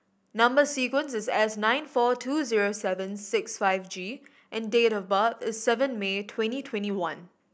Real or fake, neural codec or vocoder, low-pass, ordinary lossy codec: real; none; none; none